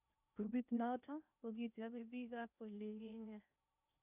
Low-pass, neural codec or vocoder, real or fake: 3.6 kHz; codec, 16 kHz in and 24 kHz out, 0.6 kbps, FocalCodec, streaming, 2048 codes; fake